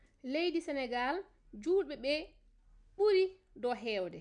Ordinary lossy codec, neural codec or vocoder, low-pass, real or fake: none; none; 9.9 kHz; real